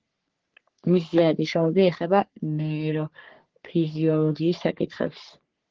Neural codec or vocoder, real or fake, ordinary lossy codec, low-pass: codec, 44.1 kHz, 3.4 kbps, Pupu-Codec; fake; Opus, 16 kbps; 7.2 kHz